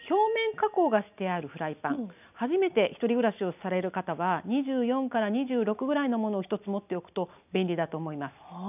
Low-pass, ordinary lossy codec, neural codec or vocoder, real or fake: 3.6 kHz; none; none; real